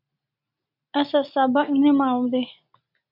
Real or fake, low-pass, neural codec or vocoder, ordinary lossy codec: real; 5.4 kHz; none; MP3, 48 kbps